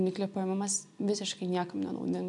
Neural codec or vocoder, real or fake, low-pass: none; real; 10.8 kHz